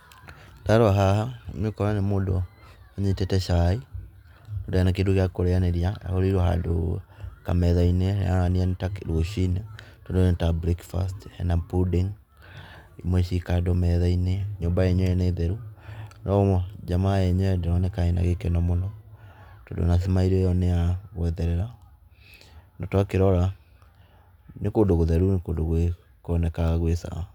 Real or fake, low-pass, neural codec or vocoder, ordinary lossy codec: real; 19.8 kHz; none; none